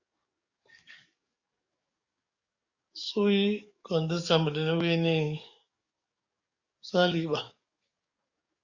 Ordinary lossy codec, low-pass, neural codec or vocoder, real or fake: Opus, 64 kbps; 7.2 kHz; codec, 44.1 kHz, 7.8 kbps, DAC; fake